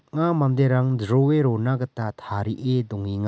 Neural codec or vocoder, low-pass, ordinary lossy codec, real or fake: none; none; none; real